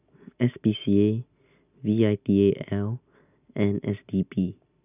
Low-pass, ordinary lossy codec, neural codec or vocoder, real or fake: 3.6 kHz; none; none; real